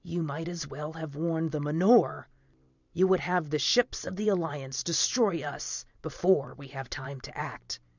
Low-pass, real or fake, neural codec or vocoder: 7.2 kHz; fake; vocoder, 44.1 kHz, 128 mel bands every 512 samples, BigVGAN v2